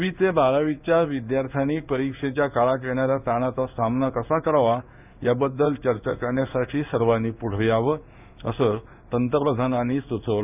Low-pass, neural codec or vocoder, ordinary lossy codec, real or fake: 3.6 kHz; codec, 16 kHz in and 24 kHz out, 1 kbps, XY-Tokenizer; none; fake